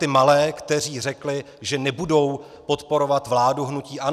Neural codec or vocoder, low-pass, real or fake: none; 14.4 kHz; real